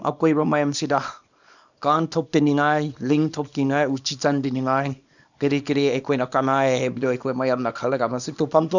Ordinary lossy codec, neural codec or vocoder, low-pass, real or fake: none; codec, 24 kHz, 0.9 kbps, WavTokenizer, small release; 7.2 kHz; fake